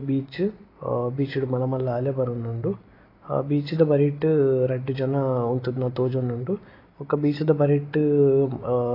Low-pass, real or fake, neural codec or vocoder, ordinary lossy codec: 5.4 kHz; real; none; AAC, 24 kbps